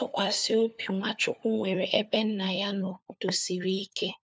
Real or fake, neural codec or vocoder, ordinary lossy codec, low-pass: fake; codec, 16 kHz, 4 kbps, FunCodec, trained on LibriTTS, 50 frames a second; none; none